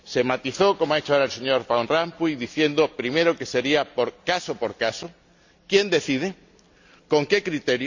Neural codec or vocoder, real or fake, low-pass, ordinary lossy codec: none; real; 7.2 kHz; none